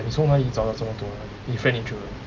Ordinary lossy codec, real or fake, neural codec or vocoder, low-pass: Opus, 32 kbps; real; none; 7.2 kHz